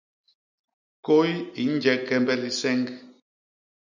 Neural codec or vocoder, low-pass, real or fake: none; 7.2 kHz; real